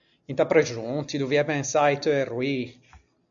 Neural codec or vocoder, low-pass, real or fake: none; 7.2 kHz; real